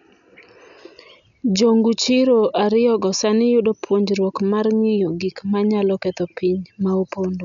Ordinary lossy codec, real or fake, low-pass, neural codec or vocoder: none; real; 7.2 kHz; none